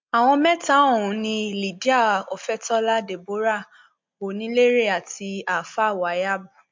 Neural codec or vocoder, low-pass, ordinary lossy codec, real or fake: none; 7.2 kHz; MP3, 48 kbps; real